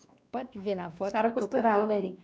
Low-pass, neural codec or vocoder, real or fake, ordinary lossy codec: none; codec, 16 kHz, 2 kbps, X-Codec, WavLM features, trained on Multilingual LibriSpeech; fake; none